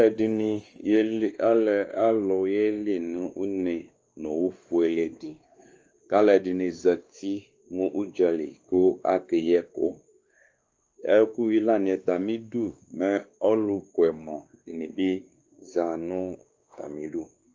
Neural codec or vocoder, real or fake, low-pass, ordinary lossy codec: codec, 16 kHz, 2 kbps, X-Codec, WavLM features, trained on Multilingual LibriSpeech; fake; 7.2 kHz; Opus, 24 kbps